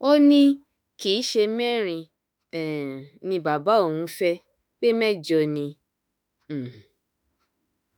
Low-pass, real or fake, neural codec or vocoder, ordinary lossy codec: none; fake; autoencoder, 48 kHz, 32 numbers a frame, DAC-VAE, trained on Japanese speech; none